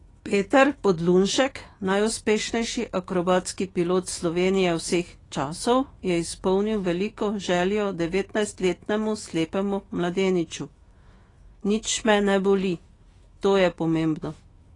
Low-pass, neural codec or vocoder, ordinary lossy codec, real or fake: 10.8 kHz; autoencoder, 48 kHz, 128 numbers a frame, DAC-VAE, trained on Japanese speech; AAC, 32 kbps; fake